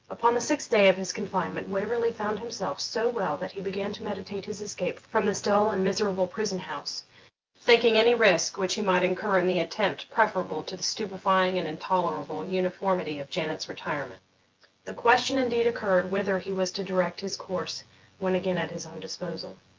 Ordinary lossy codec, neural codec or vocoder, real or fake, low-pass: Opus, 32 kbps; vocoder, 24 kHz, 100 mel bands, Vocos; fake; 7.2 kHz